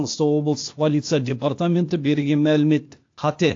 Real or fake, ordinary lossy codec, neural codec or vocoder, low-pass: fake; AAC, 48 kbps; codec, 16 kHz, 0.8 kbps, ZipCodec; 7.2 kHz